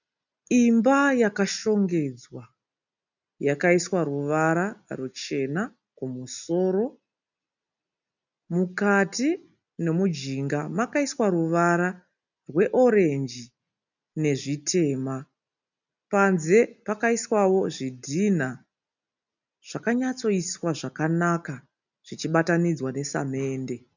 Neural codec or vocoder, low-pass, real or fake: none; 7.2 kHz; real